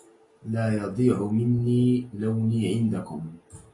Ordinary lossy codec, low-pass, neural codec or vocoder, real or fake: AAC, 32 kbps; 10.8 kHz; none; real